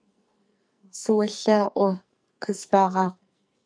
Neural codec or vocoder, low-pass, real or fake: codec, 44.1 kHz, 2.6 kbps, SNAC; 9.9 kHz; fake